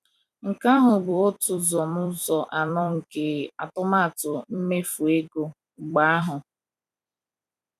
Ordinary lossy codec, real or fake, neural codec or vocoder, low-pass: none; fake; vocoder, 44.1 kHz, 128 mel bands every 256 samples, BigVGAN v2; 14.4 kHz